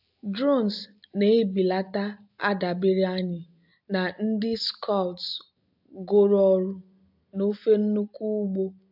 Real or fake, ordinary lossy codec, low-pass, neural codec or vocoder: real; AAC, 48 kbps; 5.4 kHz; none